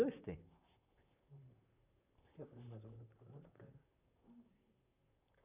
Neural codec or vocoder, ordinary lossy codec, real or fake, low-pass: vocoder, 44.1 kHz, 128 mel bands, Pupu-Vocoder; none; fake; 3.6 kHz